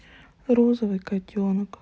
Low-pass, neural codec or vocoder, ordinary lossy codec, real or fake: none; none; none; real